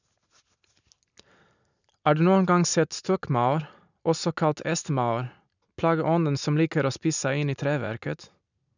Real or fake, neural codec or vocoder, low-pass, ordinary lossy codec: real; none; 7.2 kHz; none